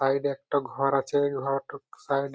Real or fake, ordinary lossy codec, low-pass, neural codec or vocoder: real; none; none; none